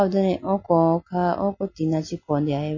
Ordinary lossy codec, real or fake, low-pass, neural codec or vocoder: MP3, 32 kbps; real; 7.2 kHz; none